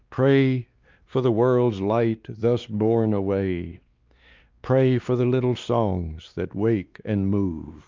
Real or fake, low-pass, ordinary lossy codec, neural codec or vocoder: fake; 7.2 kHz; Opus, 24 kbps; codec, 16 kHz, 2 kbps, X-Codec, WavLM features, trained on Multilingual LibriSpeech